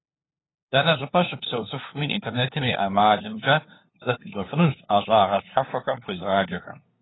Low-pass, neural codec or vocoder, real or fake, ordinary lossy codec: 7.2 kHz; codec, 16 kHz, 2 kbps, FunCodec, trained on LibriTTS, 25 frames a second; fake; AAC, 16 kbps